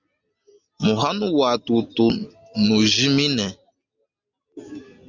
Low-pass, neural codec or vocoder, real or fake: 7.2 kHz; none; real